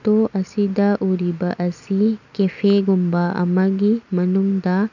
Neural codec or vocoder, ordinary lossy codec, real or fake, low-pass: none; none; real; 7.2 kHz